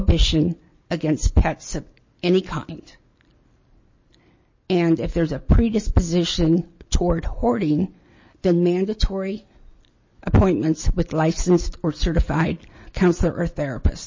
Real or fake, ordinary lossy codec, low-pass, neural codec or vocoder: real; MP3, 32 kbps; 7.2 kHz; none